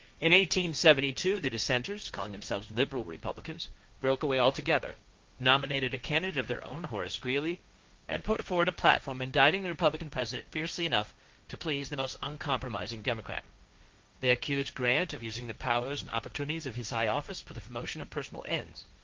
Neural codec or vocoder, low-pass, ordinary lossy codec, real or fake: codec, 16 kHz, 1.1 kbps, Voila-Tokenizer; 7.2 kHz; Opus, 32 kbps; fake